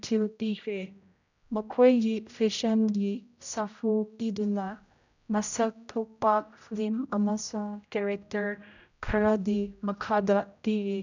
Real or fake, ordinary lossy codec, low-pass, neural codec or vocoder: fake; none; 7.2 kHz; codec, 16 kHz, 0.5 kbps, X-Codec, HuBERT features, trained on general audio